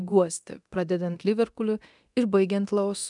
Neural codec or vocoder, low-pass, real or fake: codec, 24 kHz, 0.9 kbps, DualCodec; 10.8 kHz; fake